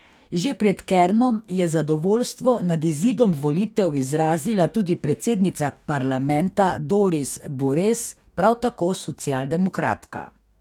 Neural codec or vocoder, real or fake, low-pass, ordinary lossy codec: codec, 44.1 kHz, 2.6 kbps, DAC; fake; 19.8 kHz; none